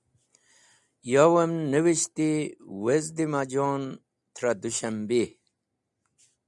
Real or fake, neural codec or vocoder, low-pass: real; none; 10.8 kHz